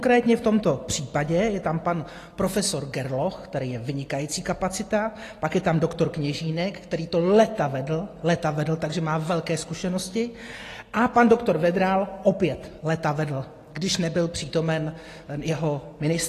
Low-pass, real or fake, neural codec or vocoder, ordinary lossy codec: 14.4 kHz; real; none; AAC, 48 kbps